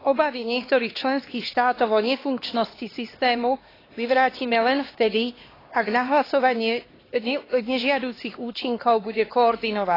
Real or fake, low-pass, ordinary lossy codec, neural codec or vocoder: fake; 5.4 kHz; AAC, 24 kbps; codec, 16 kHz, 2 kbps, X-Codec, HuBERT features, trained on LibriSpeech